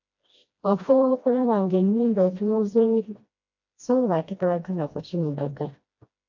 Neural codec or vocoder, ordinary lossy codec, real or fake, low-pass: codec, 16 kHz, 1 kbps, FreqCodec, smaller model; MP3, 48 kbps; fake; 7.2 kHz